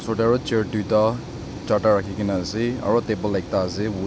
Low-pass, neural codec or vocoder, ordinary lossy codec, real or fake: none; none; none; real